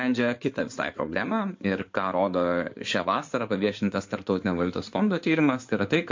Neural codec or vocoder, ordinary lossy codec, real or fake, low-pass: codec, 16 kHz in and 24 kHz out, 2.2 kbps, FireRedTTS-2 codec; MP3, 48 kbps; fake; 7.2 kHz